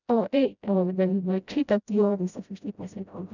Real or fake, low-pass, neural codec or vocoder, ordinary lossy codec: fake; 7.2 kHz; codec, 16 kHz, 0.5 kbps, FreqCodec, smaller model; none